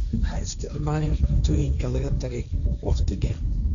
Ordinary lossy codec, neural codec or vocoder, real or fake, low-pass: MP3, 96 kbps; codec, 16 kHz, 1.1 kbps, Voila-Tokenizer; fake; 7.2 kHz